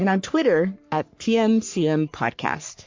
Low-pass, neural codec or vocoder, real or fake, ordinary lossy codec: 7.2 kHz; codec, 44.1 kHz, 3.4 kbps, Pupu-Codec; fake; MP3, 48 kbps